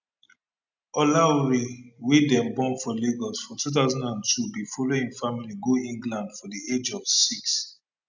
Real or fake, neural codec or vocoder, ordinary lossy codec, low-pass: real; none; none; 7.2 kHz